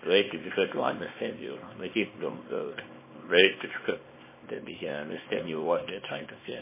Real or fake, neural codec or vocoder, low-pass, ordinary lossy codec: fake; codec, 24 kHz, 0.9 kbps, WavTokenizer, small release; 3.6 kHz; MP3, 16 kbps